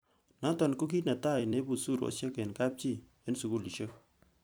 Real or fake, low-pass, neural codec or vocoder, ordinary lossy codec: fake; none; vocoder, 44.1 kHz, 128 mel bands every 256 samples, BigVGAN v2; none